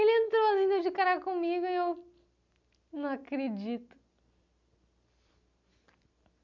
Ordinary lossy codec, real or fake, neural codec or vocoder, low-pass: none; real; none; 7.2 kHz